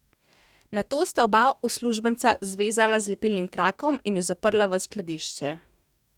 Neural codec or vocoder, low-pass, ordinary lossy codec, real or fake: codec, 44.1 kHz, 2.6 kbps, DAC; 19.8 kHz; none; fake